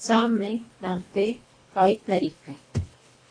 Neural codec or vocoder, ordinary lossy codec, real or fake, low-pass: codec, 24 kHz, 1.5 kbps, HILCodec; AAC, 32 kbps; fake; 9.9 kHz